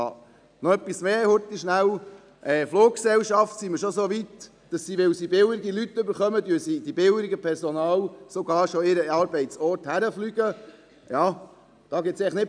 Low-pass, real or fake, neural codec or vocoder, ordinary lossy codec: 9.9 kHz; real; none; none